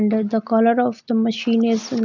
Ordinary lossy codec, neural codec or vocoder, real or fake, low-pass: none; none; real; 7.2 kHz